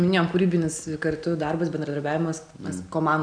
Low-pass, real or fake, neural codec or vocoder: 9.9 kHz; real; none